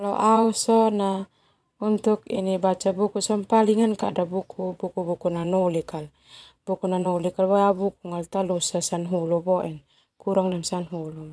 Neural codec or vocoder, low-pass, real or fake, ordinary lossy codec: vocoder, 22.05 kHz, 80 mel bands, Vocos; none; fake; none